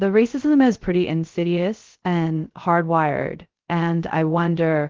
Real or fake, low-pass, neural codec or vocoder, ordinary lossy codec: fake; 7.2 kHz; codec, 16 kHz, 0.3 kbps, FocalCodec; Opus, 16 kbps